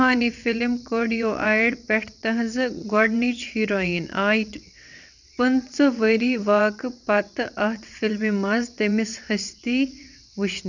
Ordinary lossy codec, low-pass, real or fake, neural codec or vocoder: none; 7.2 kHz; fake; vocoder, 22.05 kHz, 80 mel bands, WaveNeXt